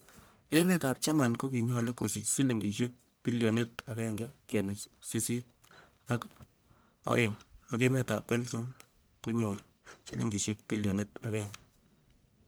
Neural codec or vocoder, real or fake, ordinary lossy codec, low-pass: codec, 44.1 kHz, 1.7 kbps, Pupu-Codec; fake; none; none